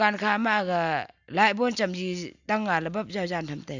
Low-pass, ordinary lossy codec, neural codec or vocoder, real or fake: 7.2 kHz; none; none; real